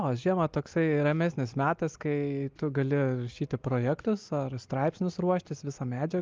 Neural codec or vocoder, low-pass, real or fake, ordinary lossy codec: none; 7.2 kHz; real; Opus, 24 kbps